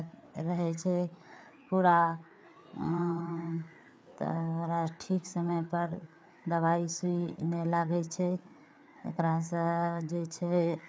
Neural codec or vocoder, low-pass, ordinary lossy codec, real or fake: codec, 16 kHz, 4 kbps, FreqCodec, larger model; none; none; fake